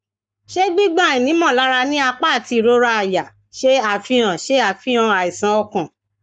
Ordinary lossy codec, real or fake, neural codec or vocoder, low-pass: none; fake; codec, 44.1 kHz, 7.8 kbps, Pupu-Codec; 9.9 kHz